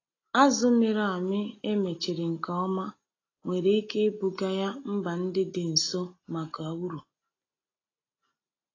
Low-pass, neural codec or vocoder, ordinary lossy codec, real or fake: 7.2 kHz; none; AAC, 32 kbps; real